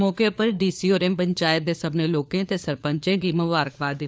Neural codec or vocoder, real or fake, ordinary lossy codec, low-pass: codec, 16 kHz, 4 kbps, FunCodec, trained on LibriTTS, 50 frames a second; fake; none; none